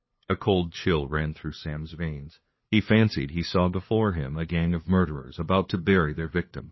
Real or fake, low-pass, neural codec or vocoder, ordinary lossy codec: fake; 7.2 kHz; codec, 16 kHz, 2 kbps, FunCodec, trained on LibriTTS, 25 frames a second; MP3, 24 kbps